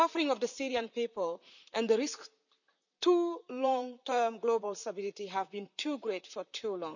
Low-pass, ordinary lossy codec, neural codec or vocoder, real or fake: 7.2 kHz; none; vocoder, 44.1 kHz, 128 mel bands, Pupu-Vocoder; fake